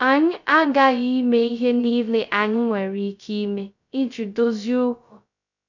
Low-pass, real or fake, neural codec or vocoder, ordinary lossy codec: 7.2 kHz; fake; codec, 16 kHz, 0.2 kbps, FocalCodec; none